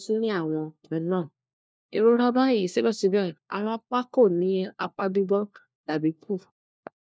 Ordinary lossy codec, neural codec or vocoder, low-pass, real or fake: none; codec, 16 kHz, 1 kbps, FunCodec, trained on LibriTTS, 50 frames a second; none; fake